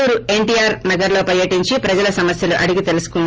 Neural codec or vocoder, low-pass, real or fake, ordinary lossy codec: none; 7.2 kHz; real; Opus, 24 kbps